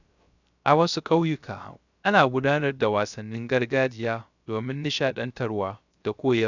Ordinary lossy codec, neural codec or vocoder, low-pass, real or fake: none; codec, 16 kHz, 0.3 kbps, FocalCodec; 7.2 kHz; fake